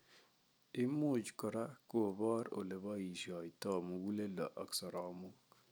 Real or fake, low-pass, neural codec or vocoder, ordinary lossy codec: real; none; none; none